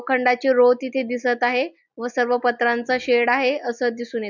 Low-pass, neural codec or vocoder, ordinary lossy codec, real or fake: 7.2 kHz; none; none; real